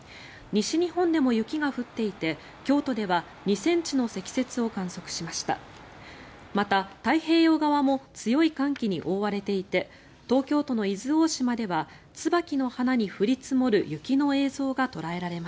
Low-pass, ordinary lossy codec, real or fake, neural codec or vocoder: none; none; real; none